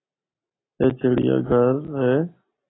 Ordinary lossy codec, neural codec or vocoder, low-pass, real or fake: AAC, 16 kbps; none; 7.2 kHz; real